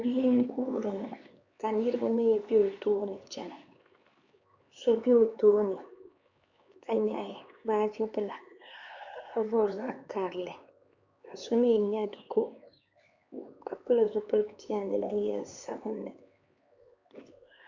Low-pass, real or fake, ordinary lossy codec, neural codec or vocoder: 7.2 kHz; fake; Opus, 64 kbps; codec, 16 kHz, 4 kbps, X-Codec, HuBERT features, trained on LibriSpeech